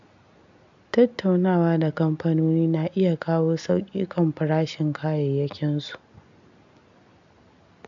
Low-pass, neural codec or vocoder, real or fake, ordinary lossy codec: 7.2 kHz; none; real; MP3, 64 kbps